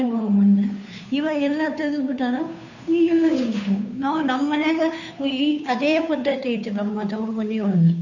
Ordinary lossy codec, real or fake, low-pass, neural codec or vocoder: none; fake; 7.2 kHz; codec, 16 kHz, 2 kbps, FunCodec, trained on Chinese and English, 25 frames a second